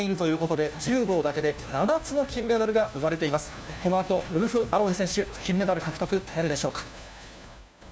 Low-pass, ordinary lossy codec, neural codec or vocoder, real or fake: none; none; codec, 16 kHz, 1 kbps, FunCodec, trained on LibriTTS, 50 frames a second; fake